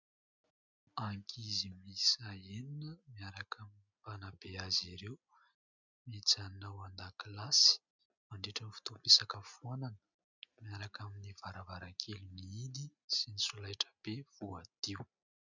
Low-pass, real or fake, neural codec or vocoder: 7.2 kHz; real; none